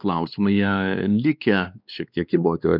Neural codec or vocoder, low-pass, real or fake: codec, 16 kHz, 2 kbps, X-Codec, WavLM features, trained on Multilingual LibriSpeech; 5.4 kHz; fake